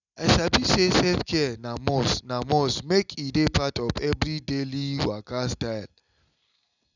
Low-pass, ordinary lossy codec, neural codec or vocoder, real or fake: 7.2 kHz; none; none; real